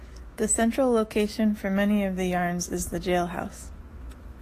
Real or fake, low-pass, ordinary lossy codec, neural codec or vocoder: fake; 14.4 kHz; AAC, 48 kbps; autoencoder, 48 kHz, 128 numbers a frame, DAC-VAE, trained on Japanese speech